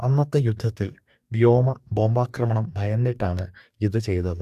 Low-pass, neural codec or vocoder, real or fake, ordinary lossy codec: 14.4 kHz; codec, 44.1 kHz, 2.6 kbps, DAC; fake; AAC, 96 kbps